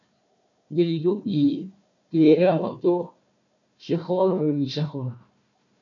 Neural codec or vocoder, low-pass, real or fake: codec, 16 kHz, 1 kbps, FunCodec, trained on Chinese and English, 50 frames a second; 7.2 kHz; fake